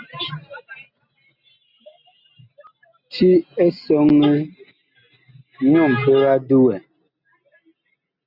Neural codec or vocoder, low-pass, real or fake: none; 5.4 kHz; real